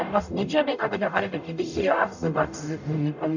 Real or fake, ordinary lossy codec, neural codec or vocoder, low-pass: fake; none; codec, 44.1 kHz, 0.9 kbps, DAC; 7.2 kHz